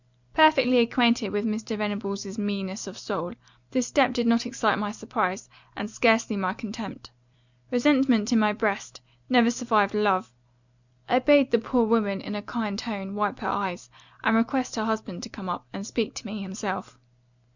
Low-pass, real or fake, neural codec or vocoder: 7.2 kHz; real; none